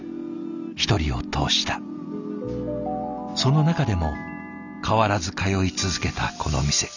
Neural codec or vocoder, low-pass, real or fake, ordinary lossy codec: none; 7.2 kHz; real; none